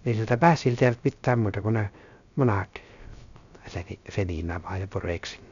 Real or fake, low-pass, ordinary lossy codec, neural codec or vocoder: fake; 7.2 kHz; none; codec, 16 kHz, 0.3 kbps, FocalCodec